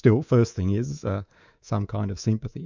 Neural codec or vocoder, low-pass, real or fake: codec, 24 kHz, 3.1 kbps, DualCodec; 7.2 kHz; fake